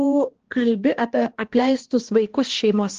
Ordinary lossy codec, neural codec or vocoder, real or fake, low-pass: Opus, 16 kbps; codec, 16 kHz, 1 kbps, X-Codec, HuBERT features, trained on balanced general audio; fake; 7.2 kHz